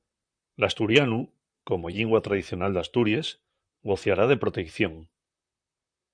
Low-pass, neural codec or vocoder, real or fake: 9.9 kHz; vocoder, 44.1 kHz, 128 mel bands, Pupu-Vocoder; fake